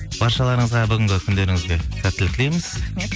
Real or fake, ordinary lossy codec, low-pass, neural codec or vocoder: real; none; none; none